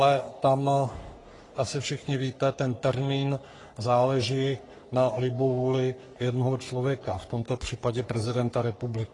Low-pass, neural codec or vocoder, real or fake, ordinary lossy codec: 10.8 kHz; codec, 44.1 kHz, 3.4 kbps, Pupu-Codec; fake; AAC, 32 kbps